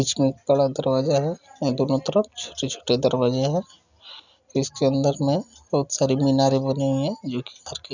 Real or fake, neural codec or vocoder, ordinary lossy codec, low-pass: real; none; none; 7.2 kHz